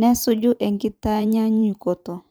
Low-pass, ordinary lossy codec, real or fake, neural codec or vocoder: none; none; fake; vocoder, 44.1 kHz, 128 mel bands every 512 samples, BigVGAN v2